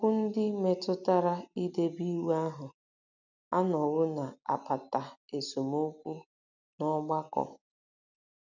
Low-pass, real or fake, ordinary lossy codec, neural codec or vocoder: 7.2 kHz; real; none; none